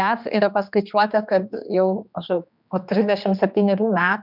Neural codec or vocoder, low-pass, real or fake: codec, 16 kHz, 2 kbps, X-Codec, HuBERT features, trained on general audio; 5.4 kHz; fake